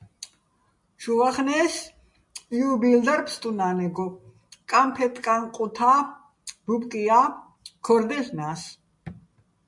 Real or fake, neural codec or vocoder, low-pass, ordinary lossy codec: real; none; 10.8 kHz; MP3, 64 kbps